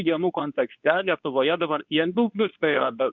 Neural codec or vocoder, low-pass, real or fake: codec, 24 kHz, 0.9 kbps, WavTokenizer, medium speech release version 2; 7.2 kHz; fake